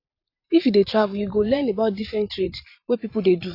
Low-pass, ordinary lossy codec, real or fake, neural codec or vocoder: 5.4 kHz; AAC, 32 kbps; fake; vocoder, 44.1 kHz, 128 mel bands every 512 samples, BigVGAN v2